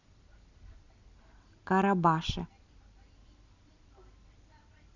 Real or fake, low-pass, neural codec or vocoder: real; 7.2 kHz; none